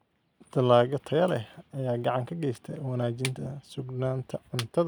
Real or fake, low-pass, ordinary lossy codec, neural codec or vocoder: real; 19.8 kHz; none; none